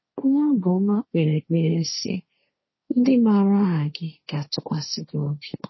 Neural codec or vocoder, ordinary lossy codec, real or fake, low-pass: codec, 16 kHz, 1.1 kbps, Voila-Tokenizer; MP3, 24 kbps; fake; 7.2 kHz